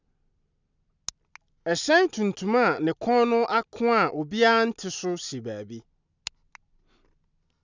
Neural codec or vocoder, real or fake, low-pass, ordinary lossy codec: none; real; 7.2 kHz; none